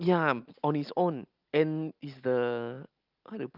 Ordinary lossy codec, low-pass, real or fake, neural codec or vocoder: Opus, 24 kbps; 5.4 kHz; real; none